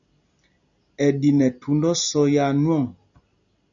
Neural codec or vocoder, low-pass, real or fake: none; 7.2 kHz; real